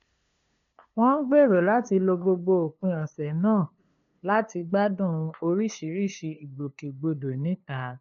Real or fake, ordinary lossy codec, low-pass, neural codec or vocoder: fake; MP3, 48 kbps; 7.2 kHz; codec, 16 kHz, 2 kbps, FunCodec, trained on LibriTTS, 25 frames a second